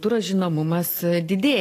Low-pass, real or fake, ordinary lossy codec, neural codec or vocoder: 14.4 kHz; real; AAC, 48 kbps; none